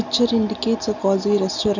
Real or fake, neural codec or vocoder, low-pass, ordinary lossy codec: real; none; 7.2 kHz; none